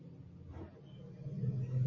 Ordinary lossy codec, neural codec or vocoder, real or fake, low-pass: MP3, 32 kbps; none; real; 7.2 kHz